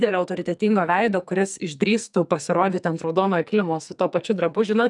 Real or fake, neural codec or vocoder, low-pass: fake; codec, 44.1 kHz, 2.6 kbps, SNAC; 10.8 kHz